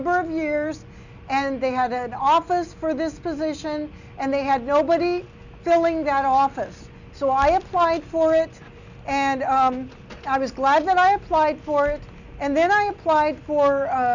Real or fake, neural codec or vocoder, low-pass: real; none; 7.2 kHz